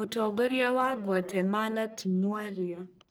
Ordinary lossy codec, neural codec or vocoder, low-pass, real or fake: none; codec, 44.1 kHz, 1.7 kbps, Pupu-Codec; none; fake